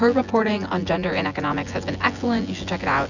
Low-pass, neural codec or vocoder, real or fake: 7.2 kHz; vocoder, 24 kHz, 100 mel bands, Vocos; fake